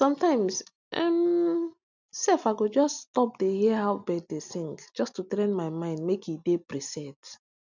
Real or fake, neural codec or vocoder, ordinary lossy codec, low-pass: real; none; none; 7.2 kHz